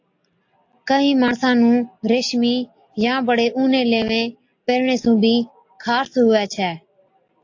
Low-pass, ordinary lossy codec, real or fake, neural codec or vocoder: 7.2 kHz; AAC, 48 kbps; real; none